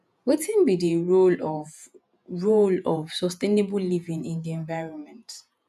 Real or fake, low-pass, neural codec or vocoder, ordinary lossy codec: fake; 14.4 kHz; vocoder, 48 kHz, 128 mel bands, Vocos; none